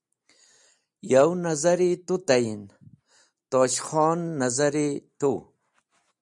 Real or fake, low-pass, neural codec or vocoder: real; 10.8 kHz; none